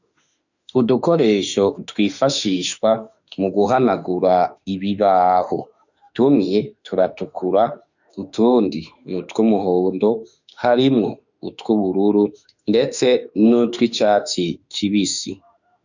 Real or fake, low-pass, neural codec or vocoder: fake; 7.2 kHz; autoencoder, 48 kHz, 32 numbers a frame, DAC-VAE, trained on Japanese speech